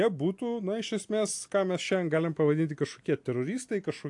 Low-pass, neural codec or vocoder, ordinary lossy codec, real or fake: 10.8 kHz; none; AAC, 64 kbps; real